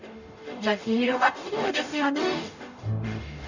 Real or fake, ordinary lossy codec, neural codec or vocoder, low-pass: fake; none; codec, 44.1 kHz, 0.9 kbps, DAC; 7.2 kHz